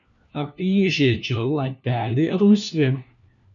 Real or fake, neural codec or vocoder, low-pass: fake; codec, 16 kHz, 1 kbps, FunCodec, trained on LibriTTS, 50 frames a second; 7.2 kHz